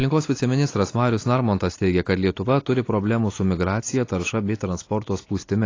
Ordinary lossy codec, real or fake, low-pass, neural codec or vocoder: AAC, 32 kbps; real; 7.2 kHz; none